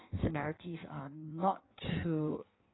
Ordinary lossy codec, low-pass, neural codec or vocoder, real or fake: AAC, 16 kbps; 7.2 kHz; codec, 16 kHz in and 24 kHz out, 1.1 kbps, FireRedTTS-2 codec; fake